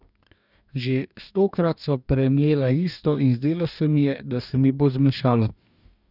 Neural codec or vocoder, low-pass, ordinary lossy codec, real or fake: codec, 44.1 kHz, 2.6 kbps, DAC; 5.4 kHz; none; fake